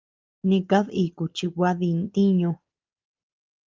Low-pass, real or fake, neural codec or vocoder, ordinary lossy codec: 7.2 kHz; real; none; Opus, 24 kbps